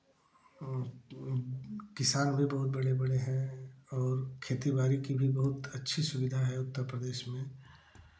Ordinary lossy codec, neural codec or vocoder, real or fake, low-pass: none; none; real; none